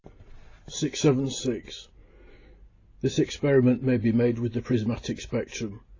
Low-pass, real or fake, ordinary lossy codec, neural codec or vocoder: 7.2 kHz; real; AAC, 32 kbps; none